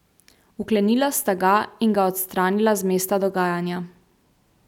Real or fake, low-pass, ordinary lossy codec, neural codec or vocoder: real; 19.8 kHz; none; none